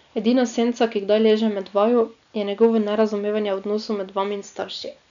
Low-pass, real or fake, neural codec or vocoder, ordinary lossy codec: 7.2 kHz; real; none; none